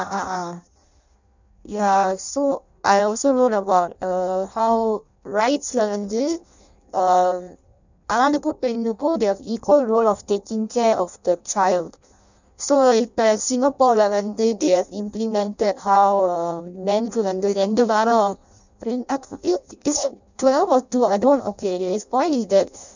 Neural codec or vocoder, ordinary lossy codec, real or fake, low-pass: codec, 16 kHz in and 24 kHz out, 0.6 kbps, FireRedTTS-2 codec; none; fake; 7.2 kHz